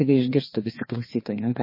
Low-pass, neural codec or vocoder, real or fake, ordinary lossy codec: 5.4 kHz; codec, 16 kHz, 2 kbps, FreqCodec, larger model; fake; MP3, 24 kbps